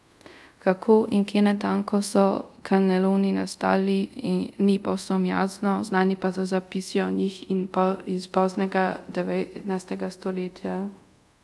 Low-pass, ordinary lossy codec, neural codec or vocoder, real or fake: none; none; codec, 24 kHz, 0.5 kbps, DualCodec; fake